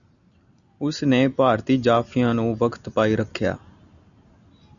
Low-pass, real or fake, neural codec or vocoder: 7.2 kHz; real; none